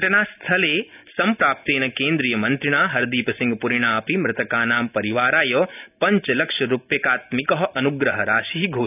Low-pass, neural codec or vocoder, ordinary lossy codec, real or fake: 3.6 kHz; none; none; real